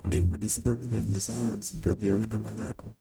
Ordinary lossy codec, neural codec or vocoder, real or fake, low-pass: none; codec, 44.1 kHz, 0.9 kbps, DAC; fake; none